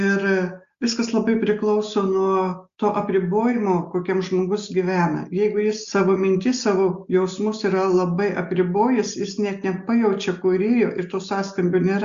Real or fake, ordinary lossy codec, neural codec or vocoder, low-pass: real; Opus, 64 kbps; none; 7.2 kHz